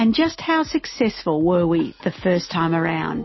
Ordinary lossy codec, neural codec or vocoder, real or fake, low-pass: MP3, 24 kbps; none; real; 7.2 kHz